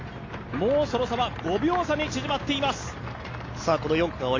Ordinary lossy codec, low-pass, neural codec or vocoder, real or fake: AAC, 32 kbps; 7.2 kHz; none; real